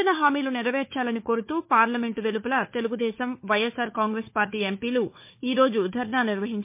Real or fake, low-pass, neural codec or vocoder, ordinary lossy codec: fake; 3.6 kHz; codec, 16 kHz, 4 kbps, FunCodec, trained on Chinese and English, 50 frames a second; MP3, 24 kbps